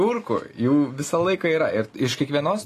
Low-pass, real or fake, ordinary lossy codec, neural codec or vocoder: 14.4 kHz; real; AAC, 48 kbps; none